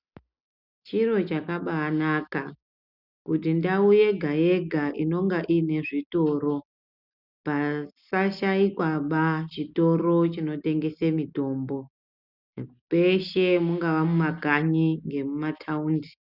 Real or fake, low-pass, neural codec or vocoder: real; 5.4 kHz; none